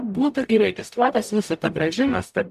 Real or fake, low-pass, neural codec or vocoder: fake; 14.4 kHz; codec, 44.1 kHz, 0.9 kbps, DAC